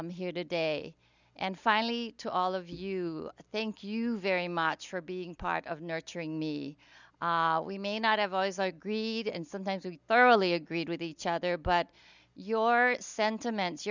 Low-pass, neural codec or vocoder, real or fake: 7.2 kHz; none; real